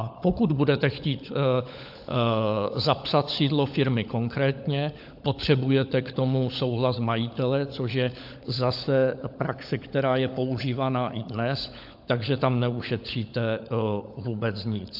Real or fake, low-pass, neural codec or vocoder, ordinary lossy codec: fake; 5.4 kHz; codec, 16 kHz, 16 kbps, FunCodec, trained on LibriTTS, 50 frames a second; AAC, 48 kbps